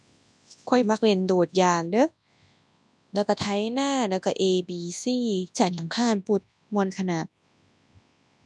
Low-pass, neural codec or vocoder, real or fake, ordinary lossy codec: none; codec, 24 kHz, 0.9 kbps, WavTokenizer, large speech release; fake; none